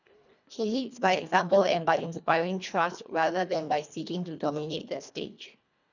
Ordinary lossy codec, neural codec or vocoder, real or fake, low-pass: none; codec, 24 kHz, 1.5 kbps, HILCodec; fake; 7.2 kHz